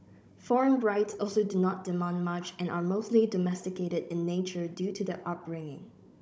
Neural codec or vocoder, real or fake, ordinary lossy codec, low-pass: codec, 16 kHz, 16 kbps, FunCodec, trained on Chinese and English, 50 frames a second; fake; none; none